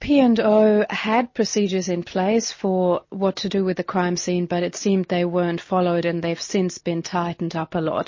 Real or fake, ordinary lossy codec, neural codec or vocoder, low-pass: real; MP3, 32 kbps; none; 7.2 kHz